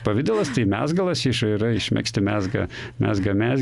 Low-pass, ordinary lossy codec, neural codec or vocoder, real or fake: 10.8 kHz; Opus, 64 kbps; none; real